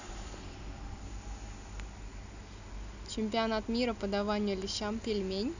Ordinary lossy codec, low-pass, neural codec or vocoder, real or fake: none; 7.2 kHz; none; real